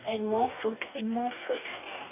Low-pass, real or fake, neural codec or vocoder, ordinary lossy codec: 3.6 kHz; fake; codec, 44.1 kHz, 2.6 kbps, DAC; none